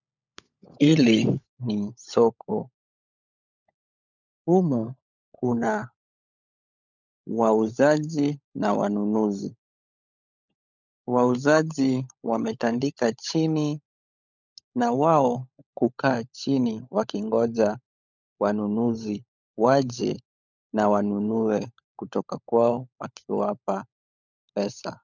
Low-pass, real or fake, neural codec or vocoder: 7.2 kHz; fake; codec, 16 kHz, 16 kbps, FunCodec, trained on LibriTTS, 50 frames a second